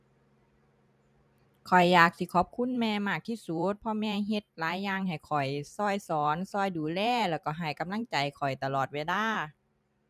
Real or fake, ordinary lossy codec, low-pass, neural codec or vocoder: fake; none; 14.4 kHz; vocoder, 44.1 kHz, 128 mel bands every 512 samples, BigVGAN v2